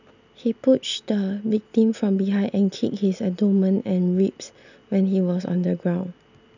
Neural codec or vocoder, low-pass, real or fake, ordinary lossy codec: none; 7.2 kHz; real; none